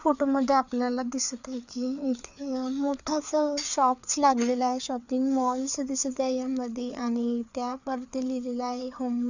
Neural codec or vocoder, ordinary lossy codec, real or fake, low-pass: codec, 16 kHz, 4 kbps, FreqCodec, larger model; none; fake; 7.2 kHz